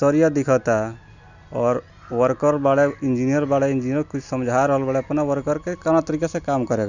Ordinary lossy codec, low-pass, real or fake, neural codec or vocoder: none; 7.2 kHz; real; none